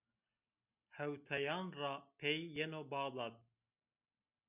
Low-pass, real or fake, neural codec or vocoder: 3.6 kHz; real; none